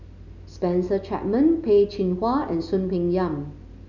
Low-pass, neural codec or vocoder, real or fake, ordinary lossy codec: 7.2 kHz; none; real; none